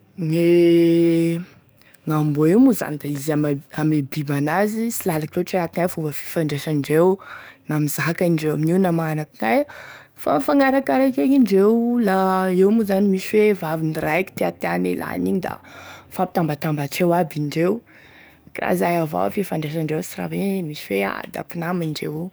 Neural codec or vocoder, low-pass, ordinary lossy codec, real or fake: codec, 44.1 kHz, 7.8 kbps, DAC; none; none; fake